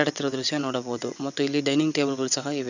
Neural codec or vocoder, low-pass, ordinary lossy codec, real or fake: vocoder, 22.05 kHz, 80 mel bands, WaveNeXt; 7.2 kHz; none; fake